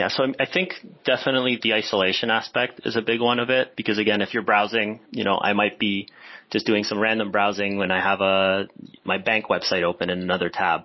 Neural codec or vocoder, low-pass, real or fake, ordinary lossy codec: none; 7.2 kHz; real; MP3, 24 kbps